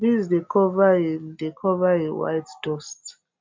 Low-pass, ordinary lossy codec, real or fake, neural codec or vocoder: 7.2 kHz; MP3, 64 kbps; real; none